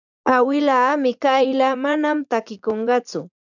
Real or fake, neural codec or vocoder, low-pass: fake; vocoder, 44.1 kHz, 80 mel bands, Vocos; 7.2 kHz